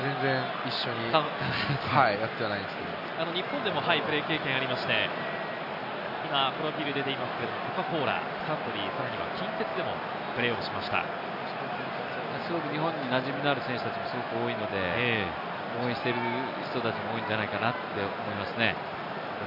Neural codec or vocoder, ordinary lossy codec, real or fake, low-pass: none; none; real; 5.4 kHz